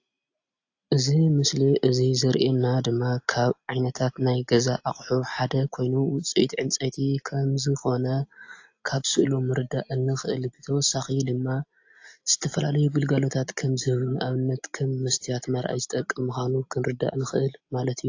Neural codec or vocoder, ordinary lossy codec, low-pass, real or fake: none; AAC, 48 kbps; 7.2 kHz; real